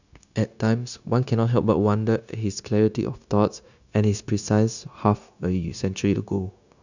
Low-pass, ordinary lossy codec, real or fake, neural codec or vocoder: 7.2 kHz; none; fake; codec, 16 kHz, 0.9 kbps, LongCat-Audio-Codec